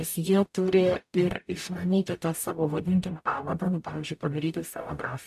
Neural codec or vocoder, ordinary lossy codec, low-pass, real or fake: codec, 44.1 kHz, 0.9 kbps, DAC; AAC, 96 kbps; 14.4 kHz; fake